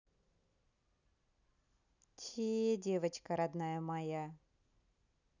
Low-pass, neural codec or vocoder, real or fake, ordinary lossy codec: 7.2 kHz; none; real; none